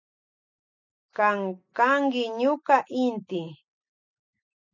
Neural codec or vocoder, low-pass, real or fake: none; 7.2 kHz; real